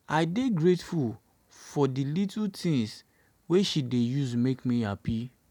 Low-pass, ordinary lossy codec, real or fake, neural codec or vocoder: 19.8 kHz; none; real; none